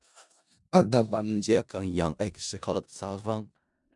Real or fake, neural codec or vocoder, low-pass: fake; codec, 16 kHz in and 24 kHz out, 0.4 kbps, LongCat-Audio-Codec, four codebook decoder; 10.8 kHz